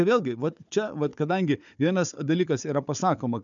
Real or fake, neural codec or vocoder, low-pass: fake; codec, 16 kHz, 4 kbps, FunCodec, trained on Chinese and English, 50 frames a second; 7.2 kHz